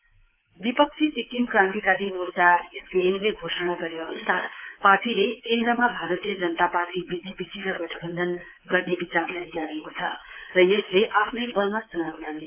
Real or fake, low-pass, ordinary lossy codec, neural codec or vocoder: fake; 3.6 kHz; AAC, 32 kbps; codec, 24 kHz, 3.1 kbps, DualCodec